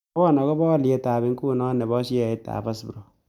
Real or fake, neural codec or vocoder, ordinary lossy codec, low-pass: real; none; none; 19.8 kHz